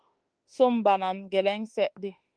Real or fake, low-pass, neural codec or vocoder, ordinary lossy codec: fake; 9.9 kHz; autoencoder, 48 kHz, 32 numbers a frame, DAC-VAE, trained on Japanese speech; Opus, 24 kbps